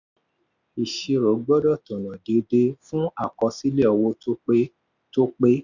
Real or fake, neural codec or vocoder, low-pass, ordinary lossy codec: real; none; 7.2 kHz; none